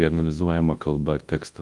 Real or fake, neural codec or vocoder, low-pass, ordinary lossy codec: fake; codec, 24 kHz, 0.9 kbps, WavTokenizer, large speech release; 10.8 kHz; Opus, 24 kbps